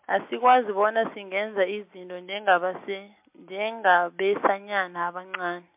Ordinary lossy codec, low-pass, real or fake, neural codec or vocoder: MP3, 32 kbps; 3.6 kHz; real; none